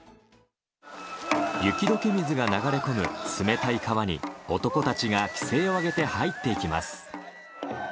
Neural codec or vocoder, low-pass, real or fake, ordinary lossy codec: none; none; real; none